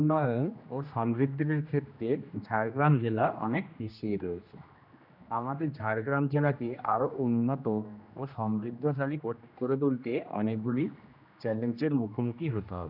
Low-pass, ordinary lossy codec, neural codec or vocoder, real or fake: 5.4 kHz; none; codec, 16 kHz, 1 kbps, X-Codec, HuBERT features, trained on general audio; fake